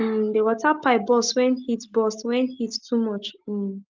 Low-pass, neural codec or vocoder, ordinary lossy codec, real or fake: 7.2 kHz; none; Opus, 32 kbps; real